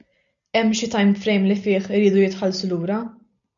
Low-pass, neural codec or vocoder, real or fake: 7.2 kHz; none; real